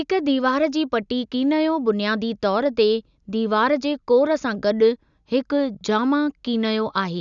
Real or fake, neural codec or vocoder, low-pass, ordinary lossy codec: real; none; 7.2 kHz; none